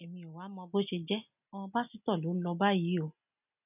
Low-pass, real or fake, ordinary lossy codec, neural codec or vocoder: 3.6 kHz; real; none; none